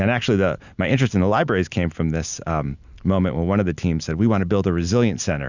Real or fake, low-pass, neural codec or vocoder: real; 7.2 kHz; none